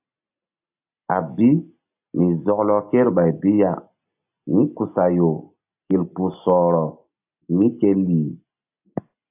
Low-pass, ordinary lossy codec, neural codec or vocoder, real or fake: 3.6 kHz; AAC, 32 kbps; none; real